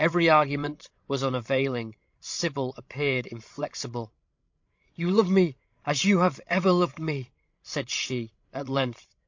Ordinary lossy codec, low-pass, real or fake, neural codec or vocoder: MP3, 48 kbps; 7.2 kHz; fake; codec, 16 kHz, 16 kbps, FreqCodec, larger model